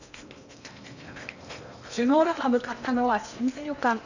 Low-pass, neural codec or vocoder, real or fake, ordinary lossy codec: 7.2 kHz; codec, 16 kHz in and 24 kHz out, 0.8 kbps, FocalCodec, streaming, 65536 codes; fake; none